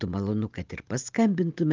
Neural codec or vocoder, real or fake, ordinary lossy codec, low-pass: none; real; Opus, 32 kbps; 7.2 kHz